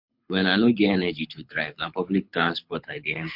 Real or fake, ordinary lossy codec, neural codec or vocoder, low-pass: fake; MP3, 48 kbps; codec, 24 kHz, 6 kbps, HILCodec; 5.4 kHz